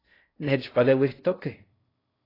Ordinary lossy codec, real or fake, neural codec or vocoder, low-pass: AAC, 24 kbps; fake; codec, 16 kHz in and 24 kHz out, 0.6 kbps, FocalCodec, streaming, 2048 codes; 5.4 kHz